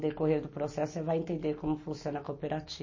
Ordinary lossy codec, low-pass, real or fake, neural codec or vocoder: MP3, 32 kbps; 7.2 kHz; real; none